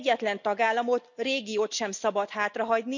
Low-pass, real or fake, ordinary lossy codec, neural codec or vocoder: 7.2 kHz; real; none; none